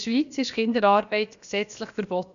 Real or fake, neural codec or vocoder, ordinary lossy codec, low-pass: fake; codec, 16 kHz, about 1 kbps, DyCAST, with the encoder's durations; none; 7.2 kHz